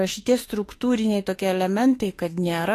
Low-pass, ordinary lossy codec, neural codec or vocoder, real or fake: 14.4 kHz; AAC, 48 kbps; autoencoder, 48 kHz, 32 numbers a frame, DAC-VAE, trained on Japanese speech; fake